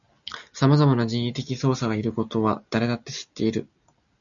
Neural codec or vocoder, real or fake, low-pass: none; real; 7.2 kHz